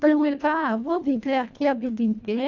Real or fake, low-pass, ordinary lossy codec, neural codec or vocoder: fake; 7.2 kHz; none; codec, 24 kHz, 1.5 kbps, HILCodec